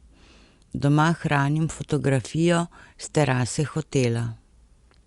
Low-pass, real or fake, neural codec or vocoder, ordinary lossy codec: 10.8 kHz; real; none; Opus, 64 kbps